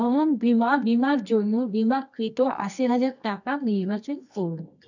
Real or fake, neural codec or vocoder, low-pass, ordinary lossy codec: fake; codec, 24 kHz, 0.9 kbps, WavTokenizer, medium music audio release; 7.2 kHz; none